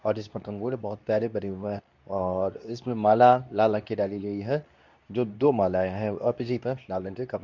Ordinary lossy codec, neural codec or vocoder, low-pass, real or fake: none; codec, 24 kHz, 0.9 kbps, WavTokenizer, medium speech release version 2; 7.2 kHz; fake